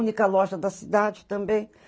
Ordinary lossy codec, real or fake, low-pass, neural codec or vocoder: none; real; none; none